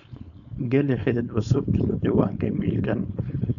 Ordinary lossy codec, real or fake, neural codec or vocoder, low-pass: none; fake; codec, 16 kHz, 4.8 kbps, FACodec; 7.2 kHz